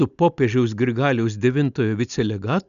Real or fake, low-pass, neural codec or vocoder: real; 7.2 kHz; none